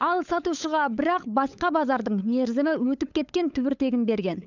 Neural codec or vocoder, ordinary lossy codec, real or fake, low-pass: codec, 16 kHz, 8 kbps, FunCodec, trained on LibriTTS, 25 frames a second; none; fake; 7.2 kHz